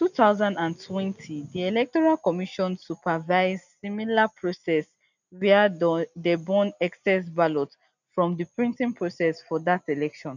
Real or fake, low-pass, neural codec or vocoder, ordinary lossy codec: real; 7.2 kHz; none; none